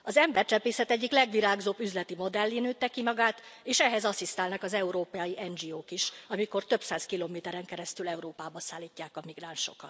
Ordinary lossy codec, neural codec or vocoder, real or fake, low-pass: none; none; real; none